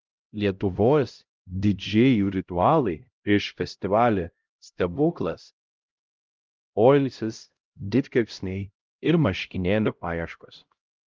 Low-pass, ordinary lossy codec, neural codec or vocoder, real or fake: 7.2 kHz; Opus, 24 kbps; codec, 16 kHz, 0.5 kbps, X-Codec, HuBERT features, trained on LibriSpeech; fake